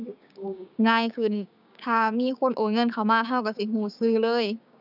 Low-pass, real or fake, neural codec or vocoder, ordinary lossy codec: 5.4 kHz; fake; codec, 16 kHz, 6 kbps, DAC; none